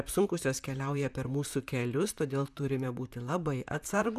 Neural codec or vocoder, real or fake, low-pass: vocoder, 44.1 kHz, 128 mel bands, Pupu-Vocoder; fake; 14.4 kHz